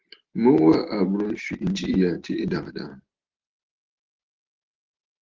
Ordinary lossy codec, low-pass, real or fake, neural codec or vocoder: Opus, 16 kbps; 7.2 kHz; real; none